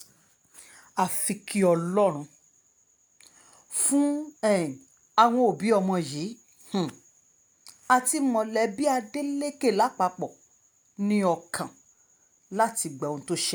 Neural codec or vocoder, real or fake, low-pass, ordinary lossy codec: none; real; none; none